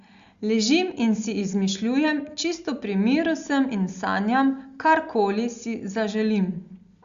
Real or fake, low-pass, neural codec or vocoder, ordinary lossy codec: real; 7.2 kHz; none; Opus, 64 kbps